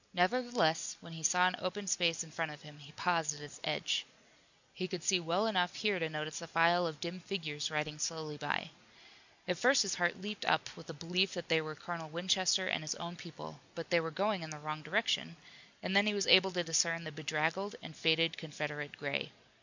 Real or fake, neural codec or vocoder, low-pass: real; none; 7.2 kHz